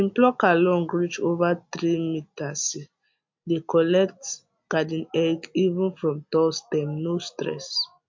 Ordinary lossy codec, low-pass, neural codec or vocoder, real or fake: MP3, 48 kbps; 7.2 kHz; none; real